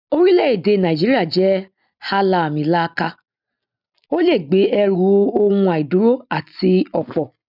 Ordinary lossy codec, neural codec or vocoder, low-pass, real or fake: none; none; 5.4 kHz; real